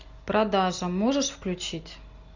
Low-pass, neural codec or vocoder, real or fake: 7.2 kHz; none; real